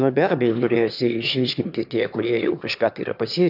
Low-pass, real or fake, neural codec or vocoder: 5.4 kHz; fake; autoencoder, 22.05 kHz, a latent of 192 numbers a frame, VITS, trained on one speaker